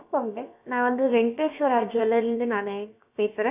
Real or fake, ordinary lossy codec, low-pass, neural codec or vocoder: fake; none; 3.6 kHz; codec, 16 kHz, about 1 kbps, DyCAST, with the encoder's durations